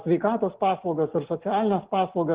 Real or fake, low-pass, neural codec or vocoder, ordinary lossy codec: real; 3.6 kHz; none; Opus, 16 kbps